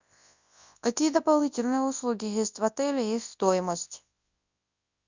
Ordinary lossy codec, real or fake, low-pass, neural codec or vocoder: Opus, 64 kbps; fake; 7.2 kHz; codec, 24 kHz, 0.9 kbps, WavTokenizer, large speech release